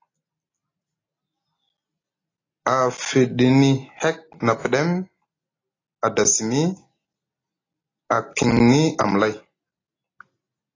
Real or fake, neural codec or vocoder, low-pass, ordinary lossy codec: real; none; 7.2 kHz; AAC, 32 kbps